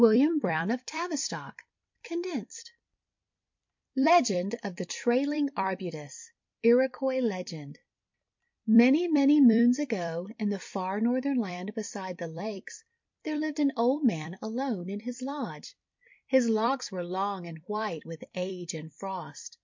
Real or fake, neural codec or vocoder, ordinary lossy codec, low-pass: fake; vocoder, 44.1 kHz, 128 mel bands every 256 samples, BigVGAN v2; MP3, 48 kbps; 7.2 kHz